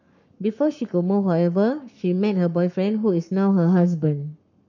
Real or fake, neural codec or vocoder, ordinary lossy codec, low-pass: fake; codec, 44.1 kHz, 7.8 kbps, Pupu-Codec; none; 7.2 kHz